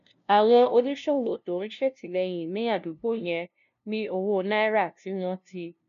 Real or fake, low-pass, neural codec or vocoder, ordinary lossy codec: fake; 7.2 kHz; codec, 16 kHz, 0.5 kbps, FunCodec, trained on LibriTTS, 25 frames a second; none